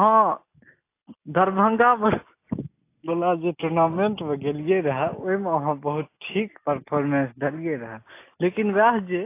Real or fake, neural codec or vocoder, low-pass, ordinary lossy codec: real; none; 3.6 kHz; AAC, 24 kbps